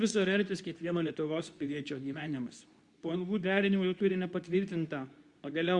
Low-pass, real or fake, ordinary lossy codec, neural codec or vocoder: 10.8 kHz; fake; Opus, 64 kbps; codec, 24 kHz, 0.9 kbps, WavTokenizer, medium speech release version 2